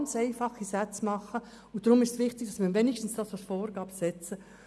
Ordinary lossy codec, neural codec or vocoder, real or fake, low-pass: none; none; real; none